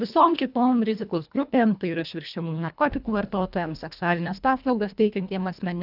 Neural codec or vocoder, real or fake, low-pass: codec, 24 kHz, 1.5 kbps, HILCodec; fake; 5.4 kHz